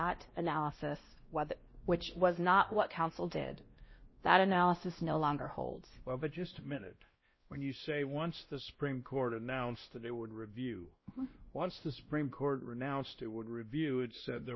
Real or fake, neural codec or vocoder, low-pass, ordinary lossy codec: fake; codec, 16 kHz, 1 kbps, X-Codec, WavLM features, trained on Multilingual LibriSpeech; 7.2 kHz; MP3, 24 kbps